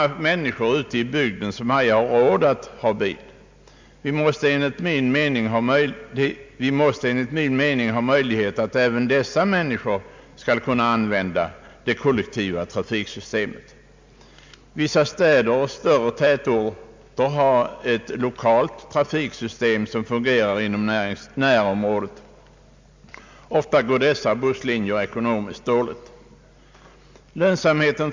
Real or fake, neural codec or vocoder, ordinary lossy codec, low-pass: real; none; MP3, 64 kbps; 7.2 kHz